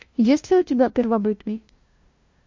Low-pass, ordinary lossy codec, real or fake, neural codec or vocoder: 7.2 kHz; MP3, 48 kbps; fake; codec, 16 kHz, 0.5 kbps, FunCodec, trained on LibriTTS, 25 frames a second